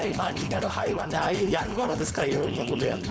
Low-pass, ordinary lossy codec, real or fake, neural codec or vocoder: none; none; fake; codec, 16 kHz, 4.8 kbps, FACodec